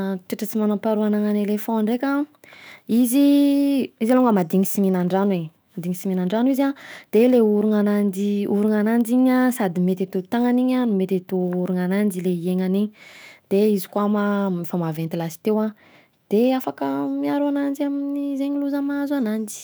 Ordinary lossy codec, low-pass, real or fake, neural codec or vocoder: none; none; fake; autoencoder, 48 kHz, 128 numbers a frame, DAC-VAE, trained on Japanese speech